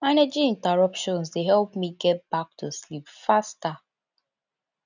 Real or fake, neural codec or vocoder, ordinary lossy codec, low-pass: real; none; none; 7.2 kHz